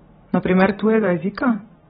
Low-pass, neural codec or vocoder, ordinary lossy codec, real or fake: 19.8 kHz; vocoder, 44.1 kHz, 128 mel bands every 512 samples, BigVGAN v2; AAC, 16 kbps; fake